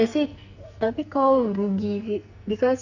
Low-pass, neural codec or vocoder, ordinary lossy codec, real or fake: 7.2 kHz; codec, 44.1 kHz, 2.6 kbps, SNAC; none; fake